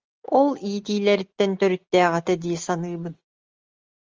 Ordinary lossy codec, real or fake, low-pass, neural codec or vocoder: Opus, 32 kbps; real; 7.2 kHz; none